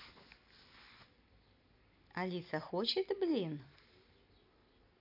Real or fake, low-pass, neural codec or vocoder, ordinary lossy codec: real; 5.4 kHz; none; none